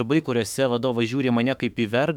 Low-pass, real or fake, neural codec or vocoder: 19.8 kHz; fake; autoencoder, 48 kHz, 32 numbers a frame, DAC-VAE, trained on Japanese speech